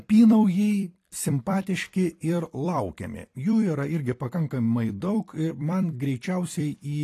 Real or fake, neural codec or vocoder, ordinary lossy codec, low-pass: fake; vocoder, 44.1 kHz, 128 mel bands every 256 samples, BigVGAN v2; AAC, 48 kbps; 14.4 kHz